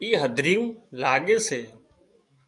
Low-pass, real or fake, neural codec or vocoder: 10.8 kHz; fake; codec, 44.1 kHz, 7.8 kbps, DAC